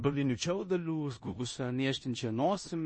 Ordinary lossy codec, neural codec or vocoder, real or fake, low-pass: MP3, 32 kbps; codec, 16 kHz in and 24 kHz out, 0.9 kbps, LongCat-Audio-Codec, four codebook decoder; fake; 9.9 kHz